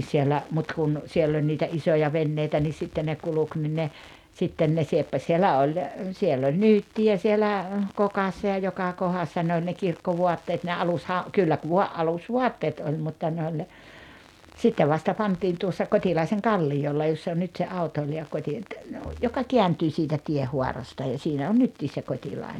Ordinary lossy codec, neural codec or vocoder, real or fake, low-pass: none; none; real; 19.8 kHz